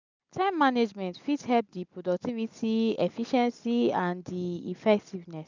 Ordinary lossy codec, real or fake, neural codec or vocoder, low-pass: none; real; none; 7.2 kHz